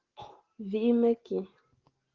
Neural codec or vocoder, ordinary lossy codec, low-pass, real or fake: none; Opus, 16 kbps; 7.2 kHz; real